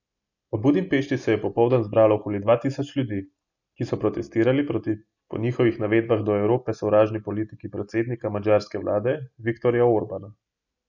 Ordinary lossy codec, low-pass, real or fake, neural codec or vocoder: none; 7.2 kHz; real; none